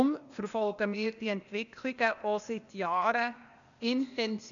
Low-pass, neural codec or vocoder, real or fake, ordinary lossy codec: 7.2 kHz; codec, 16 kHz, 0.8 kbps, ZipCodec; fake; none